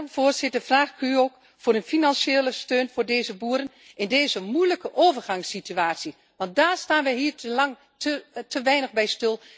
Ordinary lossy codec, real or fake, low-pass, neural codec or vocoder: none; real; none; none